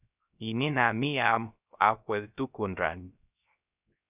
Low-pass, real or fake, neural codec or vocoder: 3.6 kHz; fake; codec, 16 kHz, 0.3 kbps, FocalCodec